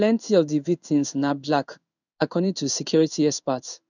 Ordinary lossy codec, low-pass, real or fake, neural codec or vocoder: none; 7.2 kHz; fake; codec, 16 kHz in and 24 kHz out, 1 kbps, XY-Tokenizer